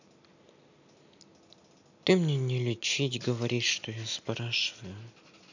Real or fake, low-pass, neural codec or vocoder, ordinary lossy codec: real; 7.2 kHz; none; none